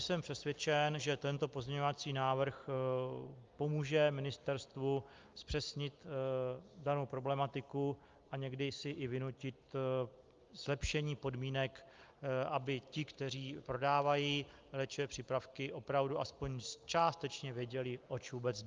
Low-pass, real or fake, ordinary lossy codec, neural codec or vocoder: 7.2 kHz; real; Opus, 32 kbps; none